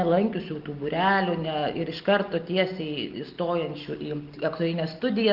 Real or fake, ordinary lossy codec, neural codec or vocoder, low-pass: real; Opus, 32 kbps; none; 5.4 kHz